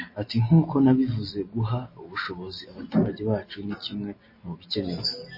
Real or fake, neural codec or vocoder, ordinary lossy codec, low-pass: real; none; MP3, 24 kbps; 5.4 kHz